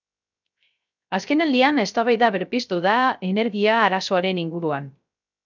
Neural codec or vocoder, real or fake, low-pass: codec, 16 kHz, 0.3 kbps, FocalCodec; fake; 7.2 kHz